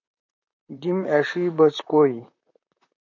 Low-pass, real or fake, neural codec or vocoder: 7.2 kHz; fake; vocoder, 44.1 kHz, 128 mel bands, Pupu-Vocoder